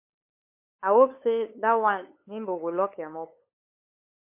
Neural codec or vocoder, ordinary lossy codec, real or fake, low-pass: codec, 16 kHz, 8 kbps, FunCodec, trained on LibriTTS, 25 frames a second; MP3, 24 kbps; fake; 3.6 kHz